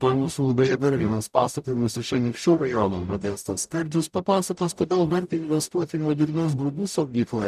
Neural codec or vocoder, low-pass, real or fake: codec, 44.1 kHz, 0.9 kbps, DAC; 14.4 kHz; fake